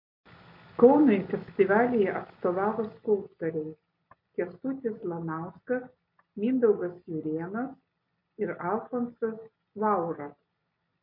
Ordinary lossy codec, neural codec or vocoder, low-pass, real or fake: MP3, 32 kbps; none; 5.4 kHz; real